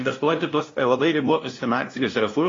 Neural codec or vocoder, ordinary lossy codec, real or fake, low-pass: codec, 16 kHz, 0.5 kbps, FunCodec, trained on LibriTTS, 25 frames a second; AAC, 32 kbps; fake; 7.2 kHz